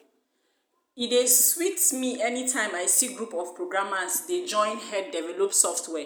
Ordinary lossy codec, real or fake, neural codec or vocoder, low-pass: none; real; none; none